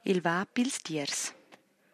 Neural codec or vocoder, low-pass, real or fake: vocoder, 44.1 kHz, 128 mel bands every 256 samples, BigVGAN v2; 14.4 kHz; fake